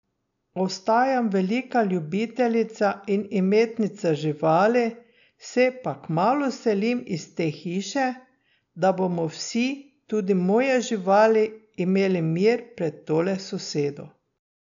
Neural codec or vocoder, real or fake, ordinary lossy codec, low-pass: none; real; none; 7.2 kHz